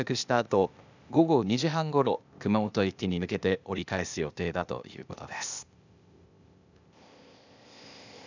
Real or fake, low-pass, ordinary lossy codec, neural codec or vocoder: fake; 7.2 kHz; none; codec, 16 kHz, 0.8 kbps, ZipCodec